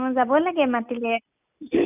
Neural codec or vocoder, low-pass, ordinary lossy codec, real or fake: none; 3.6 kHz; none; real